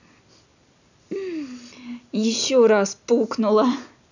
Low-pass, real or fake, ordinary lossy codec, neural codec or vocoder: 7.2 kHz; real; none; none